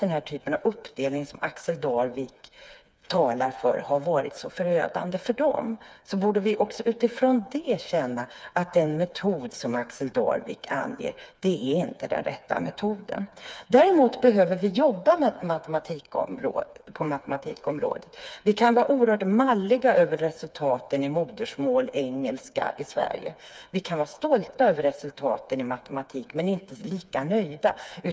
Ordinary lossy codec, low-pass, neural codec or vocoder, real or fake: none; none; codec, 16 kHz, 4 kbps, FreqCodec, smaller model; fake